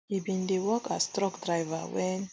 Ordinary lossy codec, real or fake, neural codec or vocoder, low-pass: none; real; none; none